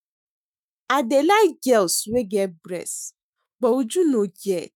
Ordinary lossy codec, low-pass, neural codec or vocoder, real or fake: none; none; autoencoder, 48 kHz, 128 numbers a frame, DAC-VAE, trained on Japanese speech; fake